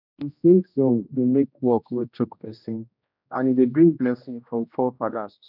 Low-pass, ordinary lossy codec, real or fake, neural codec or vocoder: 5.4 kHz; none; fake; codec, 16 kHz, 1 kbps, X-Codec, HuBERT features, trained on general audio